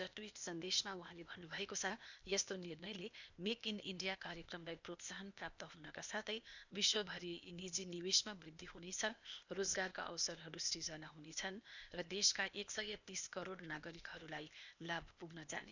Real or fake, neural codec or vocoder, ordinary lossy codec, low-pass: fake; codec, 16 kHz, 0.8 kbps, ZipCodec; none; 7.2 kHz